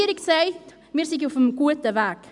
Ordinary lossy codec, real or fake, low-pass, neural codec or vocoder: MP3, 96 kbps; real; 10.8 kHz; none